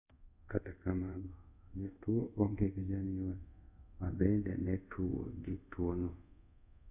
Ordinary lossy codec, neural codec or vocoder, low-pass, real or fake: none; codec, 24 kHz, 0.5 kbps, DualCodec; 3.6 kHz; fake